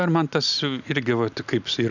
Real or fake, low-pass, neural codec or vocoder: real; 7.2 kHz; none